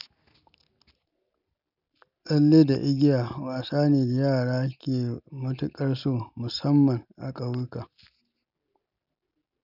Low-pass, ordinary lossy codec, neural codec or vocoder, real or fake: 5.4 kHz; none; none; real